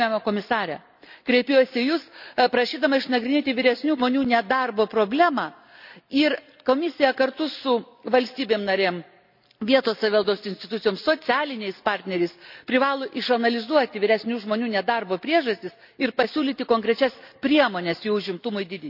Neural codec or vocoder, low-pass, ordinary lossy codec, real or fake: none; 5.4 kHz; none; real